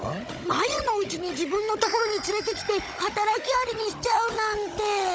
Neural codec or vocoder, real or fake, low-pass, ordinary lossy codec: codec, 16 kHz, 16 kbps, FunCodec, trained on Chinese and English, 50 frames a second; fake; none; none